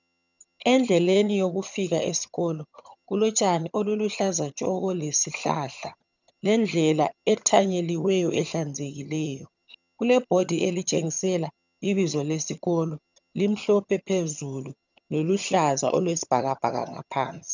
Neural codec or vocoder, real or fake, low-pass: vocoder, 22.05 kHz, 80 mel bands, HiFi-GAN; fake; 7.2 kHz